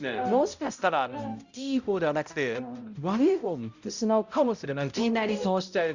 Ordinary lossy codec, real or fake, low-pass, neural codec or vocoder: Opus, 64 kbps; fake; 7.2 kHz; codec, 16 kHz, 0.5 kbps, X-Codec, HuBERT features, trained on balanced general audio